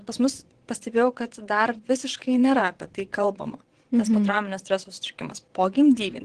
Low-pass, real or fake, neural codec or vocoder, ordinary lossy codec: 9.9 kHz; fake; vocoder, 22.05 kHz, 80 mel bands, WaveNeXt; Opus, 16 kbps